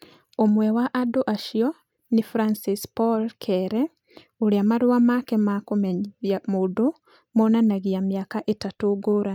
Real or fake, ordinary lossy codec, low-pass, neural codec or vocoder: real; none; 19.8 kHz; none